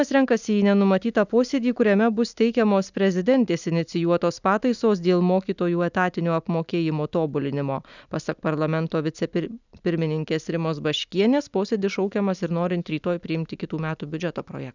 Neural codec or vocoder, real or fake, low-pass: none; real; 7.2 kHz